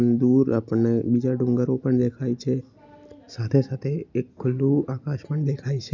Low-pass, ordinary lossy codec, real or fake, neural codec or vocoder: 7.2 kHz; none; real; none